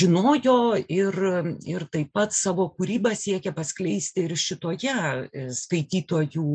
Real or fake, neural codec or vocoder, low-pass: real; none; 9.9 kHz